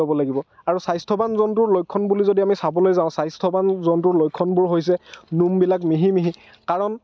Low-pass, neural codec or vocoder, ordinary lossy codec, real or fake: none; none; none; real